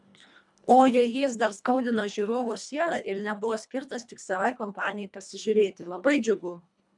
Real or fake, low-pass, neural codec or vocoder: fake; 10.8 kHz; codec, 24 kHz, 1.5 kbps, HILCodec